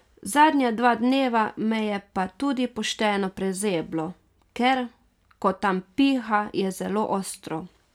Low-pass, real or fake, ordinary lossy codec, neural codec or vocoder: 19.8 kHz; real; none; none